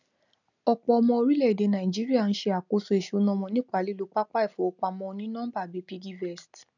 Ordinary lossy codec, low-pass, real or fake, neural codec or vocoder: none; 7.2 kHz; real; none